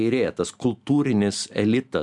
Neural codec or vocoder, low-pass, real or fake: none; 10.8 kHz; real